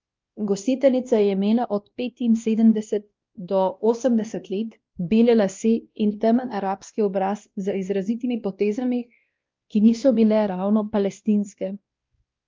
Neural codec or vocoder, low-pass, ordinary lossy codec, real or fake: codec, 16 kHz, 1 kbps, X-Codec, WavLM features, trained on Multilingual LibriSpeech; 7.2 kHz; Opus, 24 kbps; fake